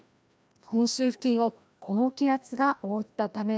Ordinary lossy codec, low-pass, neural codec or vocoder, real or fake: none; none; codec, 16 kHz, 1 kbps, FreqCodec, larger model; fake